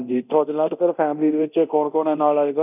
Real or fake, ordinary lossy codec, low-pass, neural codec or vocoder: fake; none; 3.6 kHz; codec, 24 kHz, 0.9 kbps, DualCodec